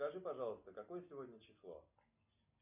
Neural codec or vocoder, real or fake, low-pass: none; real; 3.6 kHz